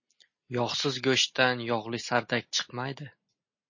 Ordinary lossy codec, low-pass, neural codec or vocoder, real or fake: MP3, 32 kbps; 7.2 kHz; none; real